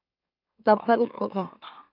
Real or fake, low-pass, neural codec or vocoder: fake; 5.4 kHz; autoencoder, 44.1 kHz, a latent of 192 numbers a frame, MeloTTS